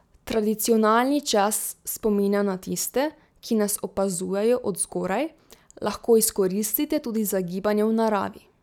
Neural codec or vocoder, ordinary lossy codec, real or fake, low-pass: none; none; real; 19.8 kHz